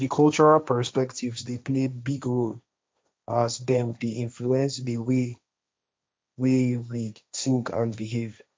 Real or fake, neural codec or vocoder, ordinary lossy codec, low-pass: fake; codec, 16 kHz, 1.1 kbps, Voila-Tokenizer; none; none